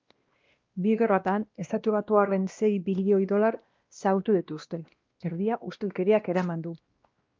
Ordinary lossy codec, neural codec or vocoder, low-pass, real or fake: Opus, 32 kbps; codec, 16 kHz, 1 kbps, X-Codec, WavLM features, trained on Multilingual LibriSpeech; 7.2 kHz; fake